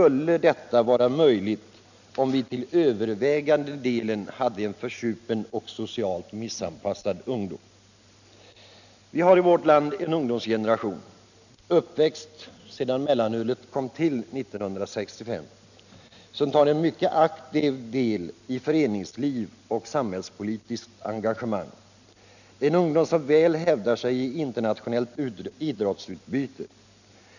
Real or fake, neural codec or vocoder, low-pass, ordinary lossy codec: real; none; 7.2 kHz; none